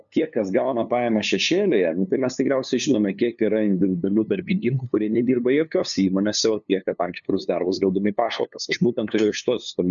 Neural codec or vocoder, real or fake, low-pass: codec, 16 kHz, 2 kbps, FunCodec, trained on LibriTTS, 25 frames a second; fake; 7.2 kHz